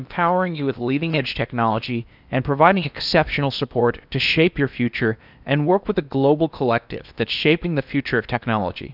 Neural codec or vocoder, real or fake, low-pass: codec, 16 kHz in and 24 kHz out, 0.8 kbps, FocalCodec, streaming, 65536 codes; fake; 5.4 kHz